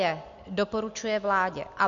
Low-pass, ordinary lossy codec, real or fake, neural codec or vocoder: 7.2 kHz; MP3, 48 kbps; real; none